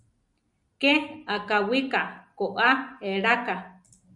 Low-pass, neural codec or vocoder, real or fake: 10.8 kHz; none; real